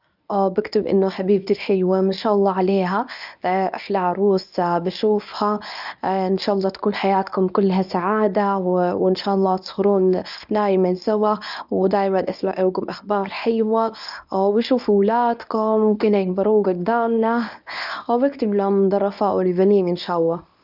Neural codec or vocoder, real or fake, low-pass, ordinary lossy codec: codec, 24 kHz, 0.9 kbps, WavTokenizer, medium speech release version 1; fake; 5.4 kHz; none